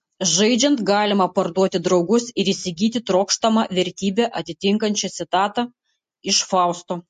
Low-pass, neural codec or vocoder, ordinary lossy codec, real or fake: 14.4 kHz; none; MP3, 48 kbps; real